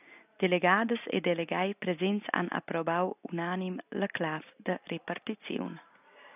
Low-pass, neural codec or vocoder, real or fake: 3.6 kHz; none; real